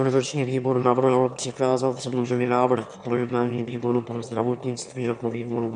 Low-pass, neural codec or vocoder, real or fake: 9.9 kHz; autoencoder, 22.05 kHz, a latent of 192 numbers a frame, VITS, trained on one speaker; fake